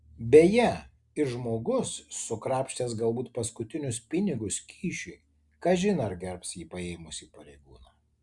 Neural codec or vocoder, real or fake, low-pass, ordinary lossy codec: none; real; 10.8 kHz; Opus, 64 kbps